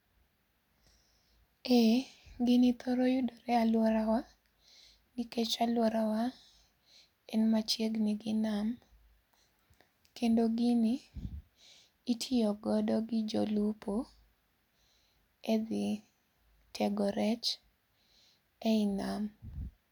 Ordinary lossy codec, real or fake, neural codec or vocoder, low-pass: none; real; none; 19.8 kHz